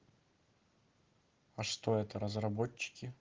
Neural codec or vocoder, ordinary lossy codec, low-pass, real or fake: none; Opus, 16 kbps; 7.2 kHz; real